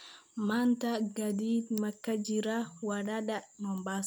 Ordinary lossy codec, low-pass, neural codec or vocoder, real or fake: none; none; none; real